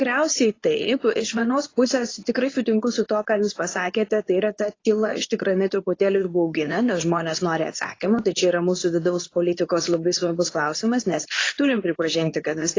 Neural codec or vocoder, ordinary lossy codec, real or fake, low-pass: codec, 24 kHz, 0.9 kbps, WavTokenizer, medium speech release version 2; AAC, 32 kbps; fake; 7.2 kHz